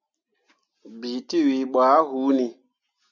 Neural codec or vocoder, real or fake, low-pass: none; real; 7.2 kHz